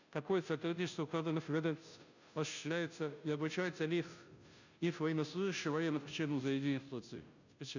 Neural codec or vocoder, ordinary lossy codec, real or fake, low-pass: codec, 16 kHz, 0.5 kbps, FunCodec, trained on Chinese and English, 25 frames a second; none; fake; 7.2 kHz